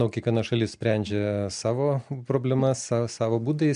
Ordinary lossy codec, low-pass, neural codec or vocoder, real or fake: MP3, 64 kbps; 9.9 kHz; none; real